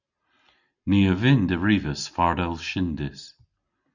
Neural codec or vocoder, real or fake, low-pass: none; real; 7.2 kHz